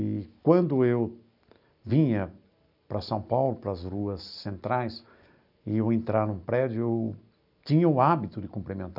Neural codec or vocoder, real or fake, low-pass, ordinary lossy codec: none; real; 5.4 kHz; none